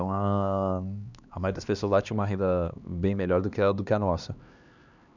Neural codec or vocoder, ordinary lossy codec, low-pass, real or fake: codec, 16 kHz, 2 kbps, X-Codec, HuBERT features, trained on LibriSpeech; none; 7.2 kHz; fake